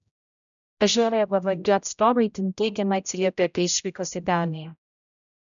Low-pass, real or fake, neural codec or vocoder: 7.2 kHz; fake; codec, 16 kHz, 0.5 kbps, X-Codec, HuBERT features, trained on general audio